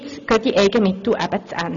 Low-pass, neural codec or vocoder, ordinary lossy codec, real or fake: 7.2 kHz; none; none; real